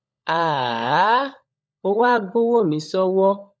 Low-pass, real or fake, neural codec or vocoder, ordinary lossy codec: none; fake; codec, 16 kHz, 16 kbps, FunCodec, trained on LibriTTS, 50 frames a second; none